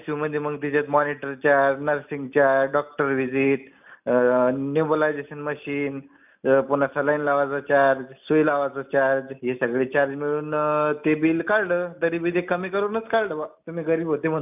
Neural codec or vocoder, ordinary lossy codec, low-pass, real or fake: none; none; 3.6 kHz; real